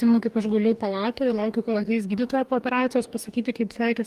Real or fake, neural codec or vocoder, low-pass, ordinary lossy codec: fake; codec, 44.1 kHz, 2.6 kbps, DAC; 14.4 kHz; Opus, 32 kbps